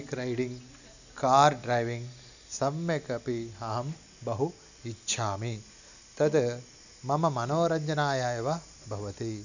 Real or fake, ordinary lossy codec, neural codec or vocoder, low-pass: real; none; none; 7.2 kHz